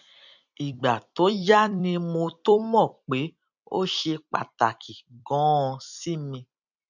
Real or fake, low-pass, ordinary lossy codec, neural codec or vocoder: fake; 7.2 kHz; none; vocoder, 44.1 kHz, 128 mel bands every 256 samples, BigVGAN v2